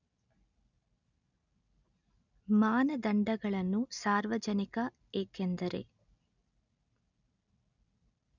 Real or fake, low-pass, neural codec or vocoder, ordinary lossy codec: real; 7.2 kHz; none; none